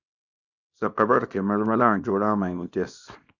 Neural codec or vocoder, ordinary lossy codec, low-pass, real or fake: codec, 24 kHz, 0.9 kbps, WavTokenizer, small release; Opus, 64 kbps; 7.2 kHz; fake